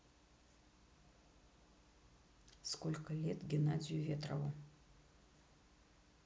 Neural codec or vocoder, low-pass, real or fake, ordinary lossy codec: none; none; real; none